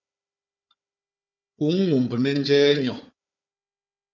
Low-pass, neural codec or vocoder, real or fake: 7.2 kHz; codec, 16 kHz, 4 kbps, FunCodec, trained on Chinese and English, 50 frames a second; fake